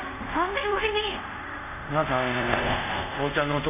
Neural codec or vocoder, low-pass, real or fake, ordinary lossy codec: codec, 24 kHz, 0.5 kbps, DualCodec; 3.6 kHz; fake; none